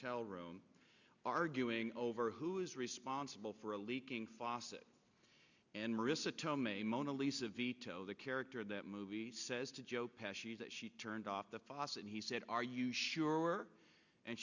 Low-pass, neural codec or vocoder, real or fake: 7.2 kHz; none; real